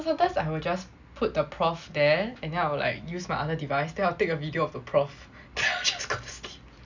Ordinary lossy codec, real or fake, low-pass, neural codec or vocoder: none; real; 7.2 kHz; none